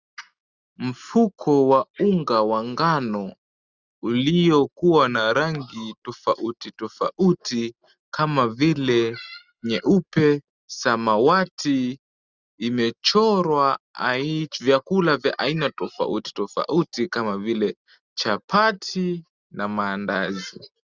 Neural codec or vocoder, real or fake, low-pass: none; real; 7.2 kHz